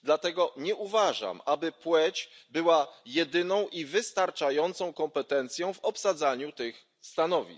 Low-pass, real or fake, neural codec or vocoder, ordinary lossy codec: none; real; none; none